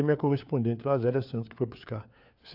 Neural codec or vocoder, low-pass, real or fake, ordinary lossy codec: codec, 16 kHz, 4 kbps, FunCodec, trained on LibriTTS, 50 frames a second; 5.4 kHz; fake; AAC, 48 kbps